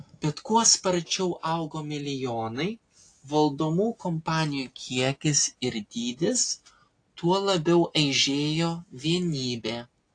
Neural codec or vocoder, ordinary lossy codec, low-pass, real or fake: none; AAC, 48 kbps; 9.9 kHz; real